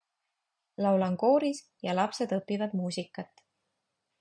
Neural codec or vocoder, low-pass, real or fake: none; 9.9 kHz; real